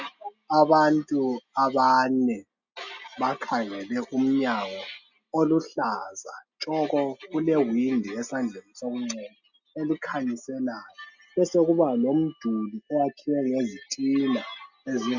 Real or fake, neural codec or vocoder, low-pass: real; none; 7.2 kHz